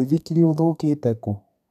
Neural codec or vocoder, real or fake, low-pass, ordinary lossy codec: codec, 32 kHz, 1.9 kbps, SNAC; fake; 14.4 kHz; none